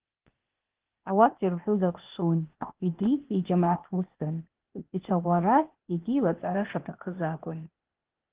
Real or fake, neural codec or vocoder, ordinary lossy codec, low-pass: fake; codec, 16 kHz, 0.8 kbps, ZipCodec; Opus, 16 kbps; 3.6 kHz